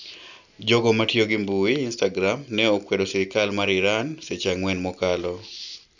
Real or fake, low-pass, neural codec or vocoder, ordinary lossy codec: real; 7.2 kHz; none; none